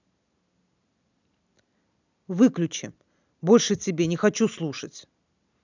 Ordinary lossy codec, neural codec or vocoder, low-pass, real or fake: none; none; 7.2 kHz; real